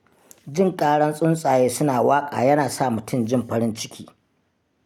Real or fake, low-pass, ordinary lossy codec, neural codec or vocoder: real; 19.8 kHz; none; none